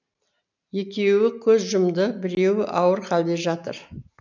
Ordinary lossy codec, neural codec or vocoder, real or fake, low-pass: none; none; real; 7.2 kHz